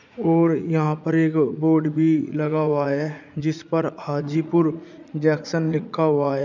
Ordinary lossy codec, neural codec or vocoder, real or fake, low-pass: none; vocoder, 44.1 kHz, 80 mel bands, Vocos; fake; 7.2 kHz